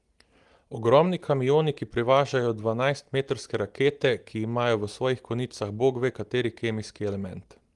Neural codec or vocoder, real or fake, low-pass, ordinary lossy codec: none; real; 10.8 kHz; Opus, 24 kbps